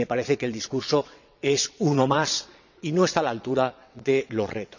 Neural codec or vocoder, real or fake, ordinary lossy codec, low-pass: vocoder, 22.05 kHz, 80 mel bands, WaveNeXt; fake; none; 7.2 kHz